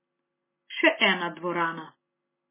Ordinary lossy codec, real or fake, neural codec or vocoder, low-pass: MP3, 16 kbps; real; none; 3.6 kHz